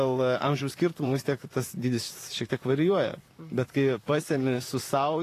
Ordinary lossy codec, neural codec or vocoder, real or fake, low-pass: AAC, 48 kbps; vocoder, 44.1 kHz, 128 mel bands, Pupu-Vocoder; fake; 14.4 kHz